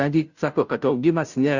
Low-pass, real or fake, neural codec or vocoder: 7.2 kHz; fake; codec, 16 kHz, 0.5 kbps, FunCodec, trained on Chinese and English, 25 frames a second